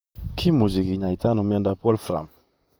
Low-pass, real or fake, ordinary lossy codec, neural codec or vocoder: none; fake; none; vocoder, 44.1 kHz, 128 mel bands, Pupu-Vocoder